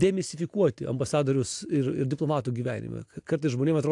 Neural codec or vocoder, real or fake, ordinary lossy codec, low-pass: none; real; AAC, 64 kbps; 10.8 kHz